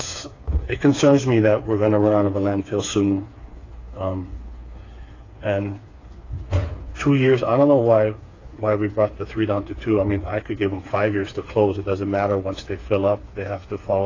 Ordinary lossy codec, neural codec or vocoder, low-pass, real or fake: AAC, 32 kbps; codec, 16 kHz, 4 kbps, FreqCodec, smaller model; 7.2 kHz; fake